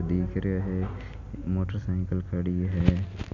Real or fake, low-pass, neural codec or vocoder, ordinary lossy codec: real; 7.2 kHz; none; none